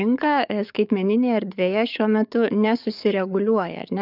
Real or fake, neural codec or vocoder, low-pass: fake; codec, 44.1 kHz, 7.8 kbps, DAC; 5.4 kHz